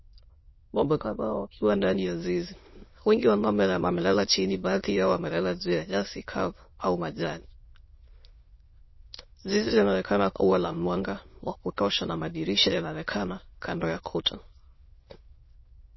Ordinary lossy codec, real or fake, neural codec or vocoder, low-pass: MP3, 24 kbps; fake; autoencoder, 22.05 kHz, a latent of 192 numbers a frame, VITS, trained on many speakers; 7.2 kHz